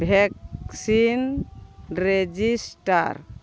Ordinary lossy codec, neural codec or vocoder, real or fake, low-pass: none; none; real; none